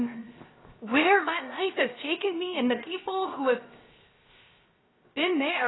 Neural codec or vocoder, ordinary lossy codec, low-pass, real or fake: codec, 16 kHz, 0.7 kbps, FocalCodec; AAC, 16 kbps; 7.2 kHz; fake